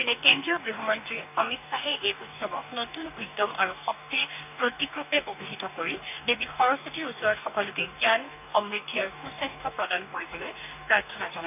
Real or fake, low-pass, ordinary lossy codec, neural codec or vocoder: fake; 3.6 kHz; none; codec, 44.1 kHz, 2.6 kbps, DAC